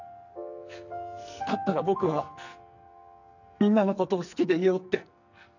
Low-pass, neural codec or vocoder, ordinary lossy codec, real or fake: 7.2 kHz; codec, 44.1 kHz, 2.6 kbps, SNAC; none; fake